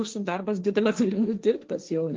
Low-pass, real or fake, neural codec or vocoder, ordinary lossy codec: 7.2 kHz; fake; codec, 16 kHz, 1.1 kbps, Voila-Tokenizer; Opus, 32 kbps